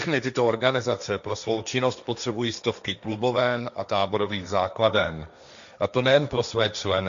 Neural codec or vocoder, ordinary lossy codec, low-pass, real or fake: codec, 16 kHz, 1.1 kbps, Voila-Tokenizer; MP3, 64 kbps; 7.2 kHz; fake